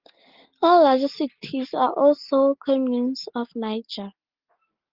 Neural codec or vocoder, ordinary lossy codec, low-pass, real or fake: none; Opus, 16 kbps; 5.4 kHz; real